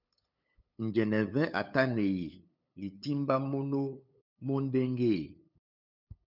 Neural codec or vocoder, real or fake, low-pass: codec, 16 kHz, 8 kbps, FunCodec, trained on LibriTTS, 25 frames a second; fake; 5.4 kHz